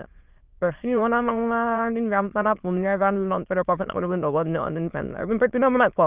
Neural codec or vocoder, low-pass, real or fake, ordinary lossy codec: autoencoder, 22.05 kHz, a latent of 192 numbers a frame, VITS, trained on many speakers; 3.6 kHz; fake; Opus, 32 kbps